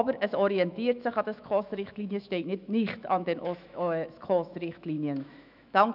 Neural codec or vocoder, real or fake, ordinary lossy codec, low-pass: autoencoder, 48 kHz, 128 numbers a frame, DAC-VAE, trained on Japanese speech; fake; none; 5.4 kHz